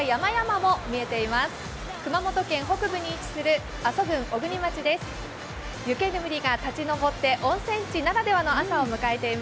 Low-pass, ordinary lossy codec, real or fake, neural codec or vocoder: none; none; real; none